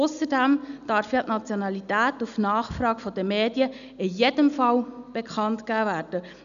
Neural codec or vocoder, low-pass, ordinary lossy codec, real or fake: none; 7.2 kHz; none; real